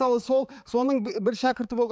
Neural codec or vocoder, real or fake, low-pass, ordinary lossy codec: codec, 16 kHz, 4 kbps, X-Codec, HuBERT features, trained on balanced general audio; fake; none; none